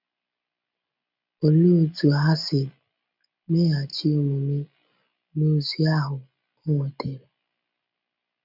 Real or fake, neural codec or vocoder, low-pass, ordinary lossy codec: real; none; 5.4 kHz; none